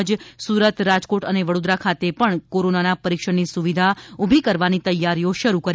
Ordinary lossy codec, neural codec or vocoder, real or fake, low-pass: none; none; real; none